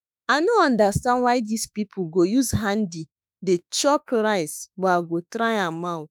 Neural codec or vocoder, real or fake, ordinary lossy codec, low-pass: autoencoder, 48 kHz, 32 numbers a frame, DAC-VAE, trained on Japanese speech; fake; none; none